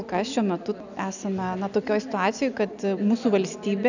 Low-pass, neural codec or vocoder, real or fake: 7.2 kHz; none; real